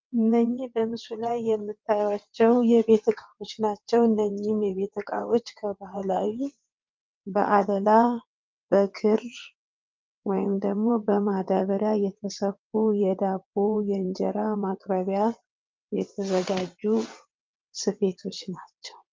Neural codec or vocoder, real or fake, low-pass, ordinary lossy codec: vocoder, 22.05 kHz, 80 mel bands, WaveNeXt; fake; 7.2 kHz; Opus, 24 kbps